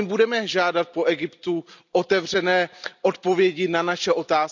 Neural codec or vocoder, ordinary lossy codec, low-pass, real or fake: none; none; 7.2 kHz; real